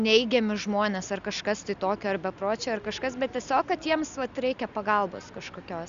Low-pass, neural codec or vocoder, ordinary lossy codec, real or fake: 7.2 kHz; none; Opus, 64 kbps; real